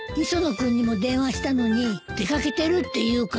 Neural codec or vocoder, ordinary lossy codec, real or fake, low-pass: none; none; real; none